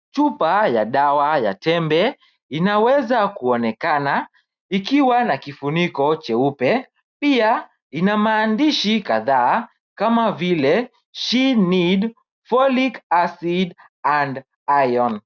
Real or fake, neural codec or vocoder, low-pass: real; none; 7.2 kHz